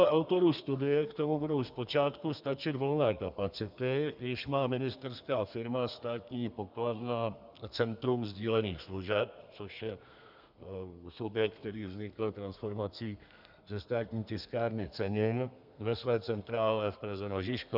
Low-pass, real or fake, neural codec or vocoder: 5.4 kHz; fake; codec, 32 kHz, 1.9 kbps, SNAC